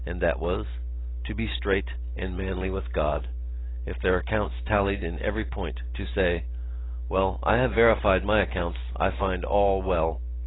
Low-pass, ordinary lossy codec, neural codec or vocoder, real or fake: 7.2 kHz; AAC, 16 kbps; none; real